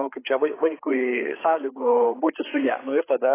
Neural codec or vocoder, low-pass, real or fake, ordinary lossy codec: codec, 16 kHz, 4 kbps, FreqCodec, larger model; 3.6 kHz; fake; AAC, 16 kbps